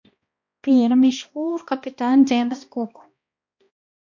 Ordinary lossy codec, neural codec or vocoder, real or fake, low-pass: MP3, 48 kbps; codec, 16 kHz, 1 kbps, X-Codec, HuBERT features, trained on balanced general audio; fake; 7.2 kHz